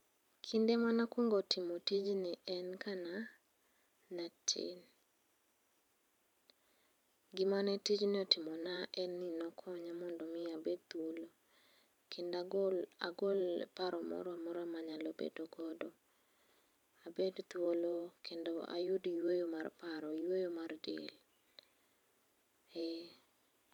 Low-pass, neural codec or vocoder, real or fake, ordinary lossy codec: 19.8 kHz; vocoder, 44.1 kHz, 128 mel bands every 512 samples, BigVGAN v2; fake; none